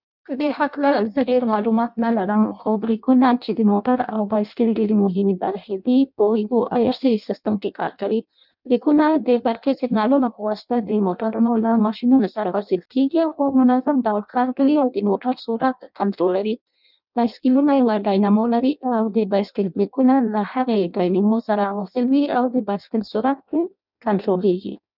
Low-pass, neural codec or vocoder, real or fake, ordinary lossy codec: 5.4 kHz; codec, 16 kHz in and 24 kHz out, 0.6 kbps, FireRedTTS-2 codec; fake; none